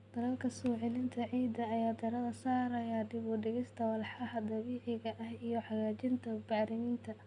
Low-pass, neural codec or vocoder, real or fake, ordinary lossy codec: 10.8 kHz; none; real; none